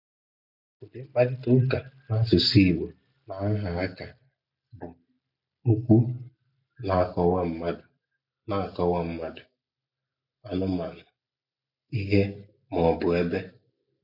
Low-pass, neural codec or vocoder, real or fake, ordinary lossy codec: 5.4 kHz; none; real; none